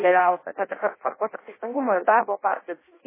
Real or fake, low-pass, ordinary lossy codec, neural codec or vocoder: fake; 3.6 kHz; MP3, 16 kbps; codec, 16 kHz in and 24 kHz out, 0.6 kbps, FireRedTTS-2 codec